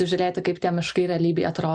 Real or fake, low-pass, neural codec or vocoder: real; 9.9 kHz; none